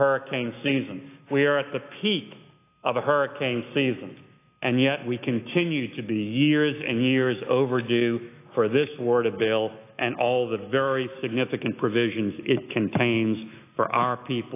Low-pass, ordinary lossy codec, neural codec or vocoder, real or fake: 3.6 kHz; AAC, 24 kbps; autoencoder, 48 kHz, 128 numbers a frame, DAC-VAE, trained on Japanese speech; fake